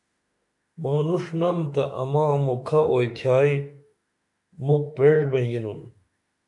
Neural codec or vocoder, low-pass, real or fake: autoencoder, 48 kHz, 32 numbers a frame, DAC-VAE, trained on Japanese speech; 10.8 kHz; fake